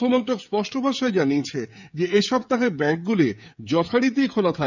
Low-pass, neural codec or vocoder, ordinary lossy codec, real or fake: 7.2 kHz; codec, 16 kHz, 16 kbps, FreqCodec, smaller model; none; fake